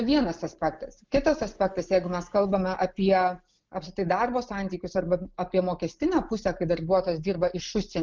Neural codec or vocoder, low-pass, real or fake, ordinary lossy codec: none; 7.2 kHz; real; Opus, 32 kbps